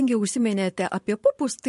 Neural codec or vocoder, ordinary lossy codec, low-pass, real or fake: vocoder, 44.1 kHz, 128 mel bands every 512 samples, BigVGAN v2; MP3, 48 kbps; 14.4 kHz; fake